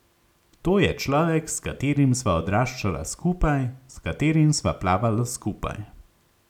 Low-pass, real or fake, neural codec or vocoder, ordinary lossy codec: 19.8 kHz; real; none; none